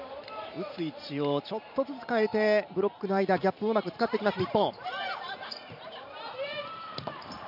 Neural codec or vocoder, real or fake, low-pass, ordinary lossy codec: vocoder, 44.1 kHz, 128 mel bands every 512 samples, BigVGAN v2; fake; 5.4 kHz; none